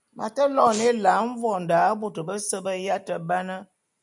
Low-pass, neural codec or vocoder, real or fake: 10.8 kHz; none; real